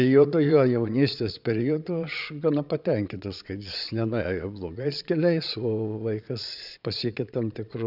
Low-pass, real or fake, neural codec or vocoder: 5.4 kHz; fake; vocoder, 22.05 kHz, 80 mel bands, Vocos